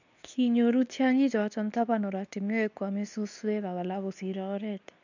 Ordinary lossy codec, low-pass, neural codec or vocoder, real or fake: none; 7.2 kHz; codec, 24 kHz, 0.9 kbps, WavTokenizer, medium speech release version 2; fake